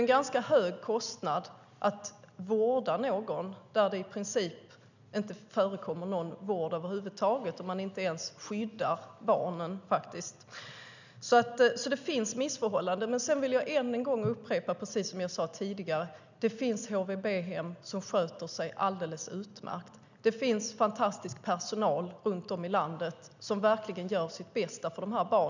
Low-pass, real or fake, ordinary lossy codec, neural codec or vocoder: 7.2 kHz; real; none; none